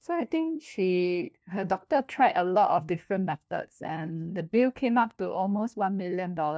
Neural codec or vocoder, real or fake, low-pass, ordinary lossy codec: codec, 16 kHz, 1 kbps, FunCodec, trained on LibriTTS, 50 frames a second; fake; none; none